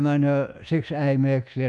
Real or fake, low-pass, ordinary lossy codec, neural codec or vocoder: fake; none; none; codec, 24 kHz, 1.2 kbps, DualCodec